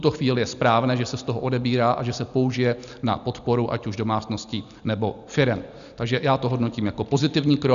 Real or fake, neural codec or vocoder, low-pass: real; none; 7.2 kHz